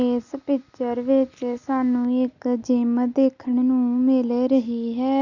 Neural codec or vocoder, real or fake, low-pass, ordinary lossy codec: none; real; 7.2 kHz; Opus, 64 kbps